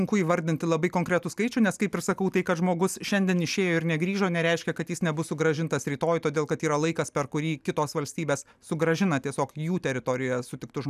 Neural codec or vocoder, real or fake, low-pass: none; real; 14.4 kHz